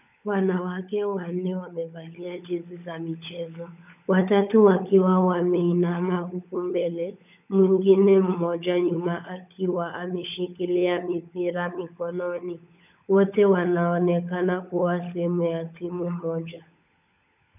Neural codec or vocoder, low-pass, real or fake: codec, 16 kHz, 16 kbps, FunCodec, trained on LibriTTS, 50 frames a second; 3.6 kHz; fake